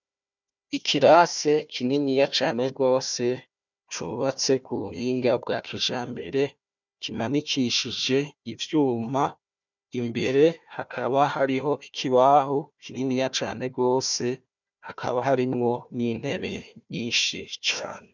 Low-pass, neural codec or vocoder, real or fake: 7.2 kHz; codec, 16 kHz, 1 kbps, FunCodec, trained on Chinese and English, 50 frames a second; fake